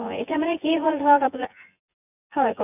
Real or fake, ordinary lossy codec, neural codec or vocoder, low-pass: fake; none; vocoder, 24 kHz, 100 mel bands, Vocos; 3.6 kHz